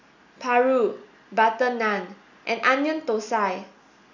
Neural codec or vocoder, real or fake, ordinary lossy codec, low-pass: none; real; none; 7.2 kHz